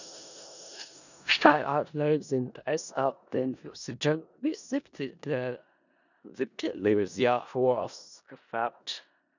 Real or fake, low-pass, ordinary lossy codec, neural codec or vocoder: fake; 7.2 kHz; none; codec, 16 kHz in and 24 kHz out, 0.4 kbps, LongCat-Audio-Codec, four codebook decoder